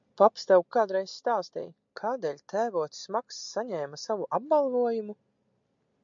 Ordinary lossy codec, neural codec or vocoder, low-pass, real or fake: MP3, 64 kbps; none; 7.2 kHz; real